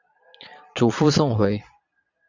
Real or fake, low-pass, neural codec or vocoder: fake; 7.2 kHz; vocoder, 22.05 kHz, 80 mel bands, Vocos